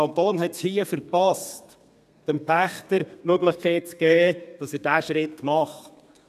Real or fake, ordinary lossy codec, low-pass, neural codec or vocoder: fake; none; 14.4 kHz; codec, 32 kHz, 1.9 kbps, SNAC